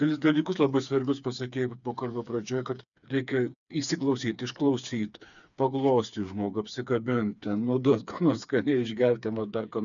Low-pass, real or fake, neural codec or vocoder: 7.2 kHz; fake; codec, 16 kHz, 4 kbps, FreqCodec, smaller model